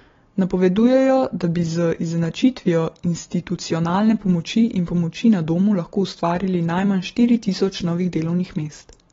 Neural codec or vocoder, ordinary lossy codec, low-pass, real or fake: none; AAC, 24 kbps; 7.2 kHz; real